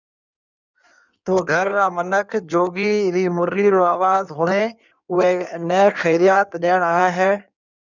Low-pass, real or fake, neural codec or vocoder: 7.2 kHz; fake; codec, 16 kHz in and 24 kHz out, 1.1 kbps, FireRedTTS-2 codec